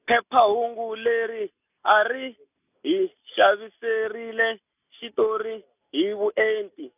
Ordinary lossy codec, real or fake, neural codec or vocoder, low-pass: none; real; none; 3.6 kHz